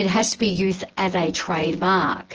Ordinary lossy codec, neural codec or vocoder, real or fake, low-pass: Opus, 16 kbps; vocoder, 24 kHz, 100 mel bands, Vocos; fake; 7.2 kHz